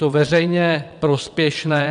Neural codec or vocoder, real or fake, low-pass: vocoder, 22.05 kHz, 80 mel bands, WaveNeXt; fake; 9.9 kHz